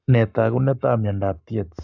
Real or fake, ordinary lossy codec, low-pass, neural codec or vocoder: fake; none; 7.2 kHz; codec, 44.1 kHz, 7.8 kbps, Pupu-Codec